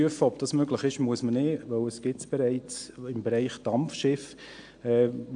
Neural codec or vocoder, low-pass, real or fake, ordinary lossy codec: none; 9.9 kHz; real; AAC, 64 kbps